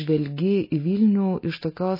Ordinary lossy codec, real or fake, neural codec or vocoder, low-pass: MP3, 24 kbps; real; none; 5.4 kHz